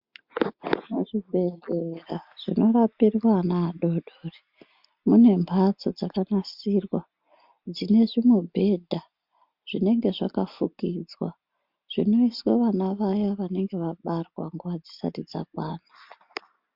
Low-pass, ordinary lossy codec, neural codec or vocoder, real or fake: 5.4 kHz; MP3, 48 kbps; none; real